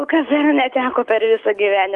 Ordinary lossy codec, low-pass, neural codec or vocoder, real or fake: Opus, 32 kbps; 10.8 kHz; autoencoder, 48 kHz, 128 numbers a frame, DAC-VAE, trained on Japanese speech; fake